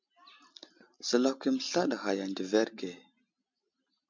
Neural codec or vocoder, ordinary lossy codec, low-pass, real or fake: none; MP3, 64 kbps; 7.2 kHz; real